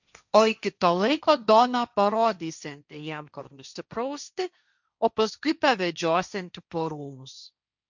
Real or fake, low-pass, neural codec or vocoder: fake; 7.2 kHz; codec, 16 kHz, 1.1 kbps, Voila-Tokenizer